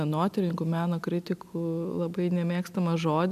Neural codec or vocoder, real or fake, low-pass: none; real; 14.4 kHz